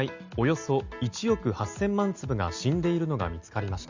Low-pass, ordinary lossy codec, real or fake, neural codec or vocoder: 7.2 kHz; Opus, 64 kbps; real; none